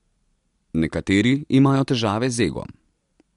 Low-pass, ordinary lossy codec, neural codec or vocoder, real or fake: 10.8 kHz; MP3, 64 kbps; none; real